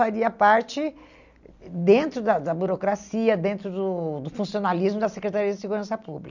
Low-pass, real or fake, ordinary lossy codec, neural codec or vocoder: 7.2 kHz; real; none; none